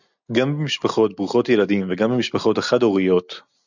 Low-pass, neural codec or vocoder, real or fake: 7.2 kHz; none; real